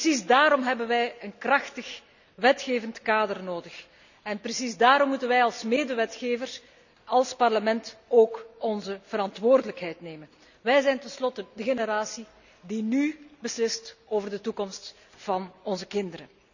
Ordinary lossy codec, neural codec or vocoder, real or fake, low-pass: none; none; real; 7.2 kHz